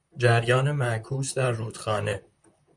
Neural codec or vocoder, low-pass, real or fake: vocoder, 44.1 kHz, 128 mel bands, Pupu-Vocoder; 10.8 kHz; fake